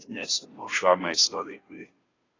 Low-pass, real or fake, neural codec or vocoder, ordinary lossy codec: 7.2 kHz; fake; codec, 16 kHz, 0.5 kbps, FunCodec, trained on Chinese and English, 25 frames a second; AAC, 32 kbps